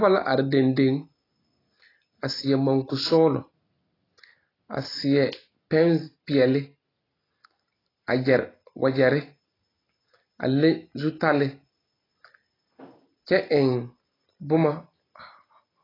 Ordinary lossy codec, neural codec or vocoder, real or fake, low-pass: AAC, 24 kbps; none; real; 5.4 kHz